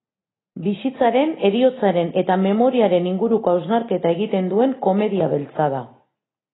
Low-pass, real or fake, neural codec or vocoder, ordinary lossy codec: 7.2 kHz; real; none; AAC, 16 kbps